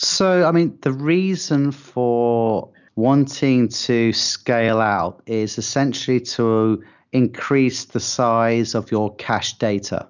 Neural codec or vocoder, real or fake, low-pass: none; real; 7.2 kHz